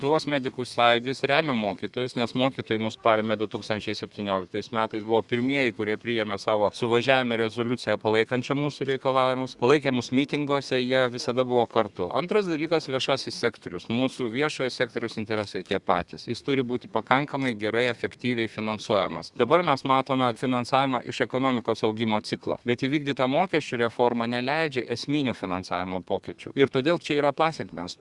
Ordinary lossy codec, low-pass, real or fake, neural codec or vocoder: Opus, 64 kbps; 10.8 kHz; fake; codec, 32 kHz, 1.9 kbps, SNAC